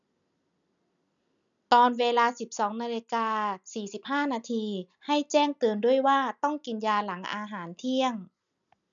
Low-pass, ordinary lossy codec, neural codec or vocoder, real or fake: 7.2 kHz; none; none; real